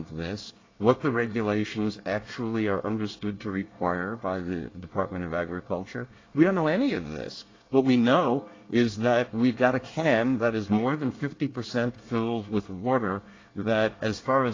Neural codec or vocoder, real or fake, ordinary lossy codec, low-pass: codec, 24 kHz, 1 kbps, SNAC; fake; AAC, 32 kbps; 7.2 kHz